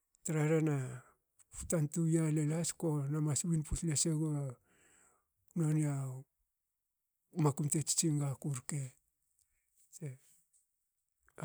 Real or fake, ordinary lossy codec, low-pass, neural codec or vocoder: real; none; none; none